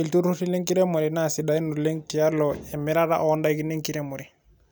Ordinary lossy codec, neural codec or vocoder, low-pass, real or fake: none; none; none; real